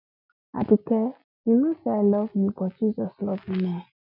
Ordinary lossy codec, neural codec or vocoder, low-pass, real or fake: none; none; 5.4 kHz; real